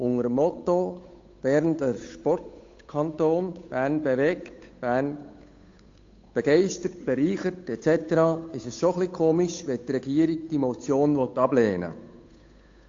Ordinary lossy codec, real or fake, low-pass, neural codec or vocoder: AAC, 48 kbps; fake; 7.2 kHz; codec, 16 kHz, 8 kbps, FunCodec, trained on Chinese and English, 25 frames a second